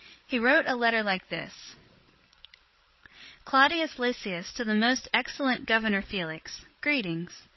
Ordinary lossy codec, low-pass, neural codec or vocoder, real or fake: MP3, 24 kbps; 7.2 kHz; codec, 16 kHz, 8 kbps, FreqCodec, larger model; fake